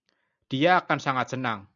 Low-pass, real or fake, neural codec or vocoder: 7.2 kHz; real; none